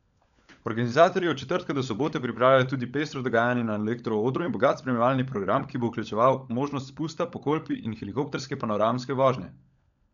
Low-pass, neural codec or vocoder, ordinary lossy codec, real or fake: 7.2 kHz; codec, 16 kHz, 16 kbps, FunCodec, trained on LibriTTS, 50 frames a second; none; fake